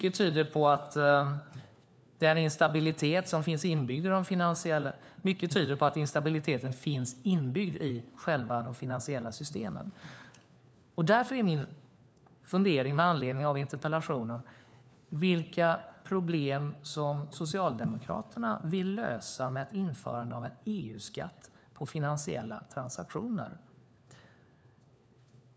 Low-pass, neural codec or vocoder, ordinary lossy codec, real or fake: none; codec, 16 kHz, 4 kbps, FunCodec, trained on LibriTTS, 50 frames a second; none; fake